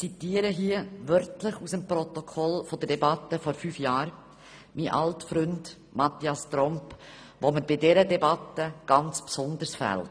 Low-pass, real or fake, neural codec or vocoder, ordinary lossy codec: none; real; none; none